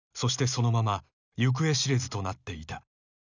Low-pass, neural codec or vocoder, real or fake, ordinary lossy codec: 7.2 kHz; none; real; none